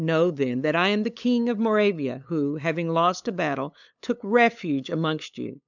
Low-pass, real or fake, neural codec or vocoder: 7.2 kHz; fake; codec, 16 kHz, 8 kbps, FunCodec, trained on LibriTTS, 25 frames a second